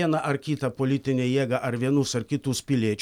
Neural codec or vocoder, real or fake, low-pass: none; real; 19.8 kHz